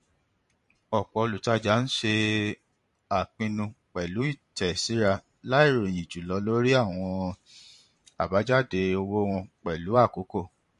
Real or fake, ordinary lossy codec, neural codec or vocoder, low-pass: fake; MP3, 48 kbps; vocoder, 44.1 kHz, 128 mel bands every 512 samples, BigVGAN v2; 14.4 kHz